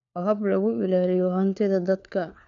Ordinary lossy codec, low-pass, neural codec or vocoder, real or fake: none; 7.2 kHz; codec, 16 kHz, 4 kbps, FunCodec, trained on LibriTTS, 50 frames a second; fake